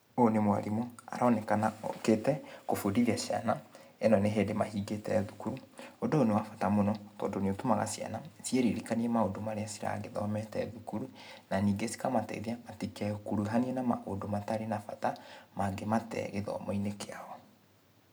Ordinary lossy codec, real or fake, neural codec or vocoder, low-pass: none; real; none; none